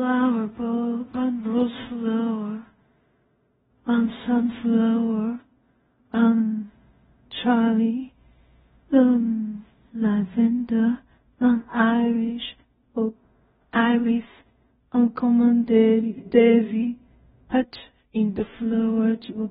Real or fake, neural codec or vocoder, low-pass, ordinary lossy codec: fake; codec, 16 kHz in and 24 kHz out, 0.4 kbps, LongCat-Audio-Codec, two codebook decoder; 10.8 kHz; AAC, 16 kbps